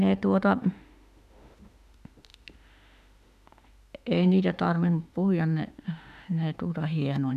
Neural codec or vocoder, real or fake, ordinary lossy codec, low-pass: autoencoder, 48 kHz, 32 numbers a frame, DAC-VAE, trained on Japanese speech; fake; none; 14.4 kHz